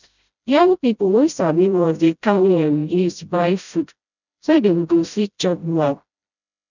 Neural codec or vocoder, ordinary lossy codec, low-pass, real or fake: codec, 16 kHz, 0.5 kbps, FreqCodec, smaller model; none; 7.2 kHz; fake